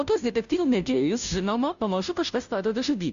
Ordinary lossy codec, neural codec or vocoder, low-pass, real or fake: Opus, 64 kbps; codec, 16 kHz, 0.5 kbps, FunCodec, trained on Chinese and English, 25 frames a second; 7.2 kHz; fake